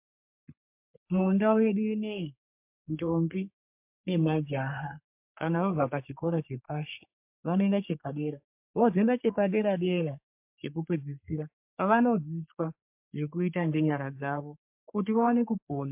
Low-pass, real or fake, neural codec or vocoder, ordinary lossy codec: 3.6 kHz; fake; codec, 44.1 kHz, 3.4 kbps, Pupu-Codec; MP3, 32 kbps